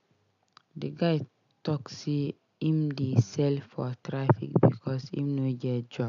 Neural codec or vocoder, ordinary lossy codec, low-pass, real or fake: none; none; 7.2 kHz; real